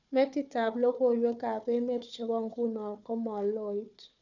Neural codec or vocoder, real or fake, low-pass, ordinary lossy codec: codec, 16 kHz, 4 kbps, FunCodec, trained on Chinese and English, 50 frames a second; fake; 7.2 kHz; none